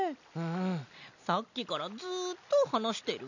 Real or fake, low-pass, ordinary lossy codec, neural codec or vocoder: real; 7.2 kHz; none; none